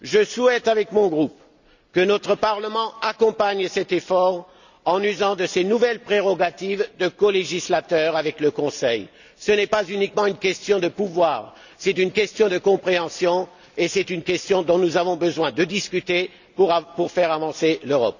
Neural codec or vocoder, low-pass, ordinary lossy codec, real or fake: none; 7.2 kHz; none; real